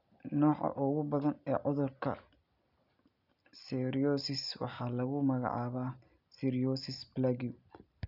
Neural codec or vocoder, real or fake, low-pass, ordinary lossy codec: none; real; 5.4 kHz; none